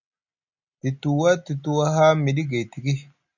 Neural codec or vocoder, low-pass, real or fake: none; 7.2 kHz; real